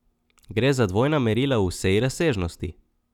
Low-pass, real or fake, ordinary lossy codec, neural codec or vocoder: 19.8 kHz; real; none; none